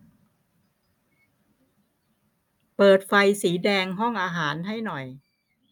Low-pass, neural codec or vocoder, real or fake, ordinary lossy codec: 19.8 kHz; none; real; none